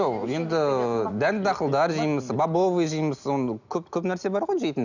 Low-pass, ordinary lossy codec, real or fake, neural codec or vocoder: 7.2 kHz; none; real; none